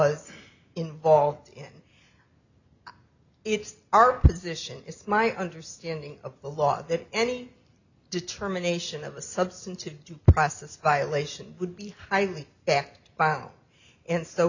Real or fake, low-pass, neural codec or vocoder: real; 7.2 kHz; none